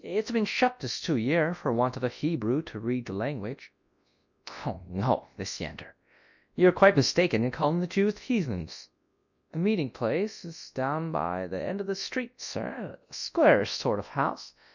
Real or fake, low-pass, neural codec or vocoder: fake; 7.2 kHz; codec, 24 kHz, 0.9 kbps, WavTokenizer, large speech release